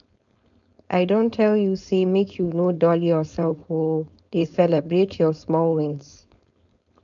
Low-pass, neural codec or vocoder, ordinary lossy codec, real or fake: 7.2 kHz; codec, 16 kHz, 4.8 kbps, FACodec; none; fake